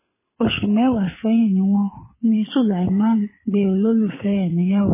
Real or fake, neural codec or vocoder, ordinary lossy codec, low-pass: fake; codec, 24 kHz, 6 kbps, HILCodec; MP3, 16 kbps; 3.6 kHz